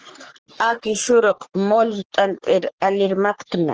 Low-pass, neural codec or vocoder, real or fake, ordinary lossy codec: 7.2 kHz; codec, 44.1 kHz, 3.4 kbps, Pupu-Codec; fake; Opus, 16 kbps